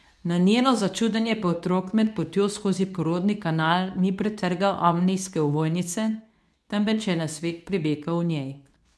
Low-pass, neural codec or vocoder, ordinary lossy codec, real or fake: none; codec, 24 kHz, 0.9 kbps, WavTokenizer, medium speech release version 2; none; fake